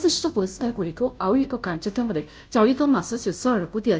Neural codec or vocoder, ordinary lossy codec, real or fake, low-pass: codec, 16 kHz, 0.5 kbps, FunCodec, trained on Chinese and English, 25 frames a second; none; fake; none